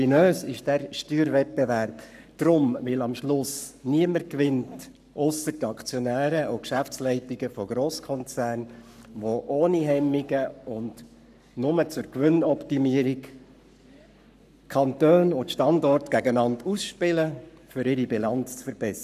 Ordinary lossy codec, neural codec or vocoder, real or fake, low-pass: none; codec, 44.1 kHz, 7.8 kbps, Pupu-Codec; fake; 14.4 kHz